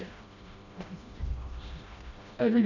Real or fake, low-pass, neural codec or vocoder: fake; 7.2 kHz; codec, 16 kHz, 1 kbps, FreqCodec, smaller model